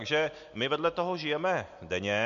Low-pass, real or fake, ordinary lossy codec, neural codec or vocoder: 7.2 kHz; real; MP3, 48 kbps; none